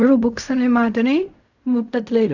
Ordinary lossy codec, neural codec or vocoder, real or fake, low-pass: none; codec, 16 kHz in and 24 kHz out, 0.4 kbps, LongCat-Audio-Codec, fine tuned four codebook decoder; fake; 7.2 kHz